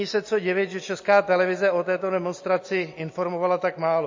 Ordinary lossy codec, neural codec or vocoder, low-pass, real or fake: MP3, 32 kbps; none; 7.2 kHz; real